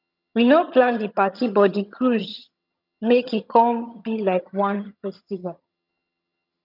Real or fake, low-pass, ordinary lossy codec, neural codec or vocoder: fake; 5.4 kHz; none; vocoder, 22.05 kHz, 80 mel bands, HiFi-GAN